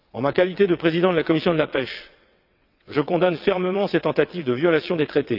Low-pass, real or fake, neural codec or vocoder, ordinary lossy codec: 5.4 kHz; fake; vocoder, 22.05 kHz, 80 mel bands, WaveNeXt; none